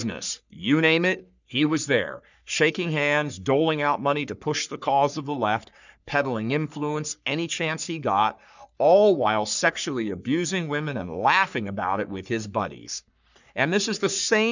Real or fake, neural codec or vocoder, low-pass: fake; codec, 44.1 kHz, 3.4 kbps, Pupu-Codec; 7.2 kHz